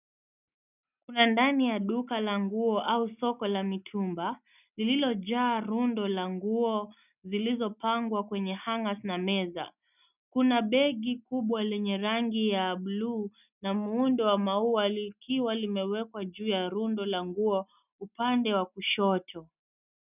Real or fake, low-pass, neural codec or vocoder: real; 3.6 kHz; none